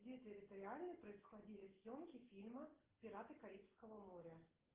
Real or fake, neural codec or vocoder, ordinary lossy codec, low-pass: real; none; Opus, 16 kbps; 3.6 kHz